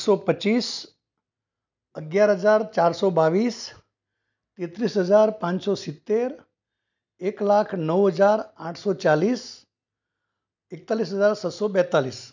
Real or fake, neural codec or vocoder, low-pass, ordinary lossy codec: real; none; 7.2 kHz; none